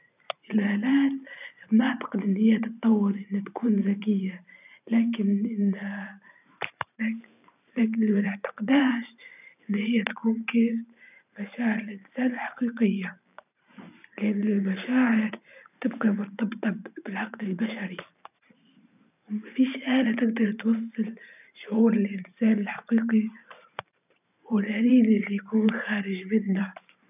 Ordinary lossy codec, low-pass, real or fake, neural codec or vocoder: none; 3.6 kHz; fake; vocoder, 44.1 kHz, 128 mel bands every 512 samples, BigVGAN v2